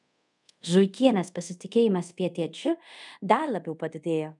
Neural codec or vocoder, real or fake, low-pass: codec, 24 kHz, 0.5 kbps, DualCodec; fake; 10.8 kHz